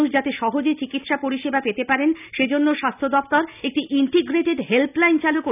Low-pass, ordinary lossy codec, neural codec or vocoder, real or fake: 3.6 kHz; none; none; real